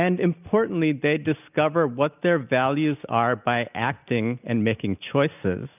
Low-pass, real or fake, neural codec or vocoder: 3.6 kHz; real; none